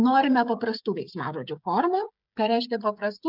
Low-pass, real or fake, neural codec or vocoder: 5.4 kHz; fake; codec, 16 kHz, 16 kbps, FreqCodec, smaller model